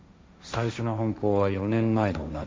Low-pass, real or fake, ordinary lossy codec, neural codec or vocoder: none; fake; none; codec, 16 kHz, 1.1 kbps, Voila-Tokenizer